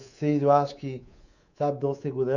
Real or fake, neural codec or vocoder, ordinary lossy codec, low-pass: fake; codec, 24 kHz, 3.1 kbps, DualCodec; none; 7.2 kHz